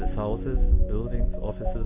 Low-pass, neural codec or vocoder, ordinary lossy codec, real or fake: 3.6 kHz; none; AAC, 24 kbps; real